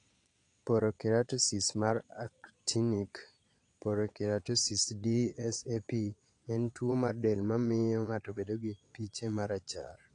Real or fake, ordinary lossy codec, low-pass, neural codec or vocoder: fake; AAC, 48 kbps; 9.9 kHz; vocoder, 22.05 kHz, 80 mel bands, Vocos